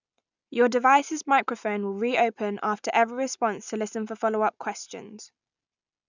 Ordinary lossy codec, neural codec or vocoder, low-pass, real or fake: none; none; 7.2 kHz; real